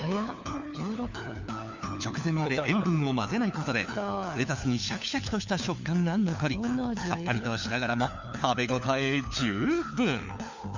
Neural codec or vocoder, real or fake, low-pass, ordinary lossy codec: codec, 16 kHz, 4 kbps, FunCodec, trained on LibriTTS, 50 frames a second; fake; 7.2 kHz; none